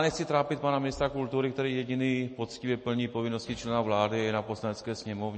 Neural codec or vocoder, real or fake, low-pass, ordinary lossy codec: none; real; 10.8 kHz; MP3, 32 kbps